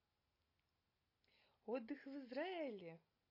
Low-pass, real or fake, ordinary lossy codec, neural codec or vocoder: 5.4 kHz; real; MP3, 32 kbps; none